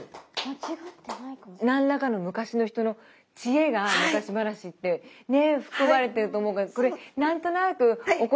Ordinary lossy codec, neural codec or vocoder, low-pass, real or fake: none; none; none; real